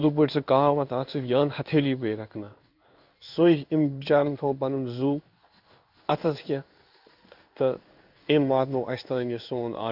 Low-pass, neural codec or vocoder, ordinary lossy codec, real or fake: 5.4 kHz; codec, 16 kHz in and 24 kHz out, 1 kbps, XY-Tokenizer; none; fake